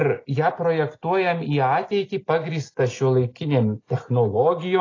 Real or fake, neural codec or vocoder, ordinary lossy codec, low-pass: real; none; AAC, 32 kbps; 7.2 kHz